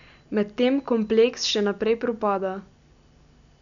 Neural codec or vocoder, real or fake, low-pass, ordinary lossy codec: none; real; 7.2 kHz; none